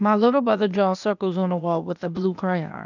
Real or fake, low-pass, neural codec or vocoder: fake; 7.2 kHz; codec, 16 kHz, 0.8 kbps, ZipCodec